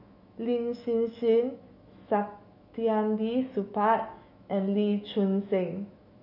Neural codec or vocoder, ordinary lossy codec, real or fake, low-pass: autoencoder, 48 kHz, 128 numbers a frame, DAC-VAE, trained on Japanese speech; none; fake; 5.4 kHz